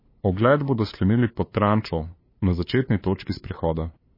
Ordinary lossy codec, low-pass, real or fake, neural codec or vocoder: MP3, 24 kbps; 5.4 kHz; fake; codec, 16 kHz, 2 kbps, FunCodec, trained on LibriTTS, 25 frames a second